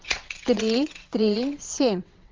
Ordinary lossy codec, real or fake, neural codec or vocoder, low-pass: Opus, 32 kbps; fake; vocoder, 22.05 kHz, 80 mel bands, WaveNeXt; 7.2 kHz